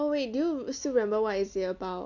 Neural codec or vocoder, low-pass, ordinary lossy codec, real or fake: none; 7.2 kHz; none; real